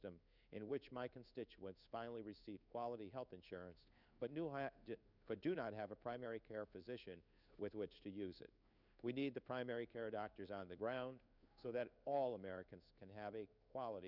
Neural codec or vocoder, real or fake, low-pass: codec, 16 kHz in and 24 kHz out, 1 kbps, XY-Tokenizer; fake; 5.4 kHz